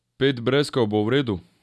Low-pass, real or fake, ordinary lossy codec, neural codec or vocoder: none; real; none; none